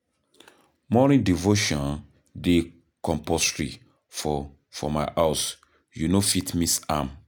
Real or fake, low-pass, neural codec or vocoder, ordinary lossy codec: real; none; none; none